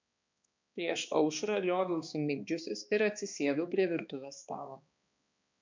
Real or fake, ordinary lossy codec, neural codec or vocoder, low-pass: fake; MP3, 64 kbps; codec, 16 kHz, 2 kbps, X-Codec, HuBERT features, trained on balanced general audio; 7.2 kHz